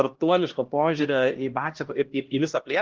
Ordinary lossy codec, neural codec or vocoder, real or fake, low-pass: Opus, 16 kbps; codec, 16 kHz, 1 kbps, X-Codec, HuBERT features, trained on LibriSpeech; fake; 7.2 kHz